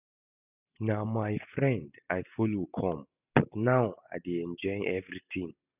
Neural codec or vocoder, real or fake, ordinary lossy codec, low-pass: none; real; none; 3.6 kHz